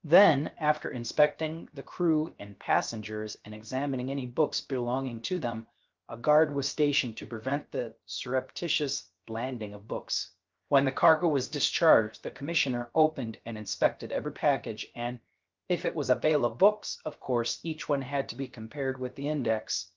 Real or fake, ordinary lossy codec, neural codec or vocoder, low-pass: fake; Opus, 24 kbps; codec, 16 kHz, 0.7 kbps, FocalCodec; 7.2 kHz